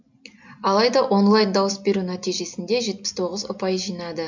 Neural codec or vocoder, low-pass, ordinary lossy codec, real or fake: none; 7.2 kHz; MP3, 64 kbps; real